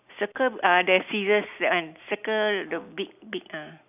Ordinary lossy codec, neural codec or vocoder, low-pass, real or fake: none; none; 3.6 kHz; real